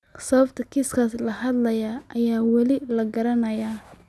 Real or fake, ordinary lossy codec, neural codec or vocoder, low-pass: real; none; none; none